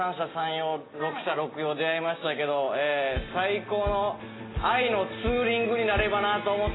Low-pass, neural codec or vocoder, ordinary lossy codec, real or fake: 7.2 kHz; none; AAC, 16 kbps; real